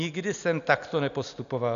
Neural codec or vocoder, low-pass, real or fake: none; 7.2 kHz; real